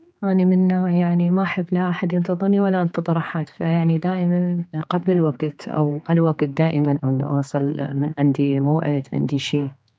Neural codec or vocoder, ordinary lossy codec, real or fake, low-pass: codec, 16 kHz, 4 kbps, X-Codec, HuBERT features, trained on general audio; none; fake; none